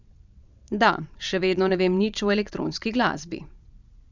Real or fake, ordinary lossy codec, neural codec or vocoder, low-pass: fake; none; vocoder, 22.05 kHz, 80 mel bands, WaveNeXt; 7.2 kHz